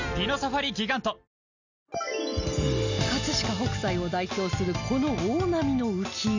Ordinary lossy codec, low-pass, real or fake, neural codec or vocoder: none; 7.2 kHz; real; none